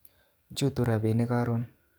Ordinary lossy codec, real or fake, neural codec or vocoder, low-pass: none; fake; codec, 44.1 kHz, 7.8 kbps, DAC; none